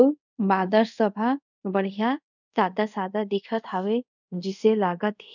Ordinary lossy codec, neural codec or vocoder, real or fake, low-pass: none; codec, 24 kHz, 0.5 kbps, DualCodec; fake; 7.2 kHz